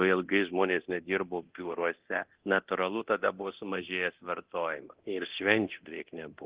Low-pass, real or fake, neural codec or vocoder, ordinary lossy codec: 3.6 kHz; fake; codec, 24 kHz, 0.9 kbps, DualCodec; Opus, 16 kbps